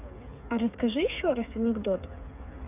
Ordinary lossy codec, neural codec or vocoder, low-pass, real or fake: none; codec, 16 kHz, 8 kbps, FreqCodec, smaller model; 3.6 kHz; fake